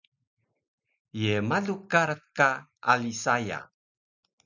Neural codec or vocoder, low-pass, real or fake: none; 7.2 kHz; real